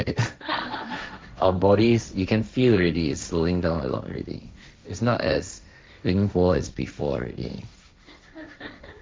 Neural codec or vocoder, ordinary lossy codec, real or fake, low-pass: codec, 16 kHz, 1.1 kbps, Voila-Tokenizer; none; fake; none